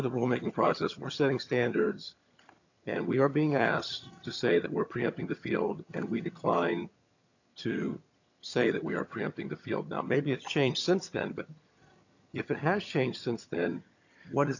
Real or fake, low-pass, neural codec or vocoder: fake; 7.2 kHz; vocoder, 22.05 kHz, 80 mel bands, HiFi-GAN